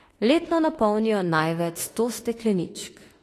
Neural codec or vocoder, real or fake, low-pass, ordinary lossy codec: autoencoder, 48 kHz, 32 numbers a frame, DAC-VAE, trained on Japanese speech; fake; 14.4 kHz; AAC, 48 kbps